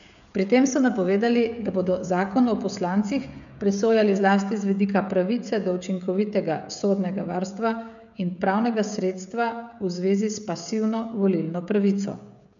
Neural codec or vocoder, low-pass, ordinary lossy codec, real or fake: codec, 16 kHz, 16 kbps, FreqCodec, smaller model; 7.2 kHz; none; fake